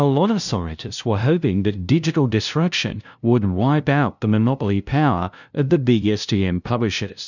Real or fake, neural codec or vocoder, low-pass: fake; codec, 16 kHz, 0.5 kbps, FunCodec, trained on LibriTTS, 25 frames a second; 7.2 kHz